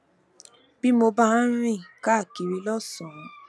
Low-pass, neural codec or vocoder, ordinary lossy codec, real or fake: none; none; none; real